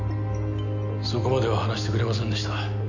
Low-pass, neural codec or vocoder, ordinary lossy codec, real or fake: 7.2 kHz; none; none; real